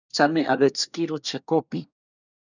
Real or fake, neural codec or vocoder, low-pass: fake; codec, 24 kHz, 1 kbps, SNAC; 7.2 kHz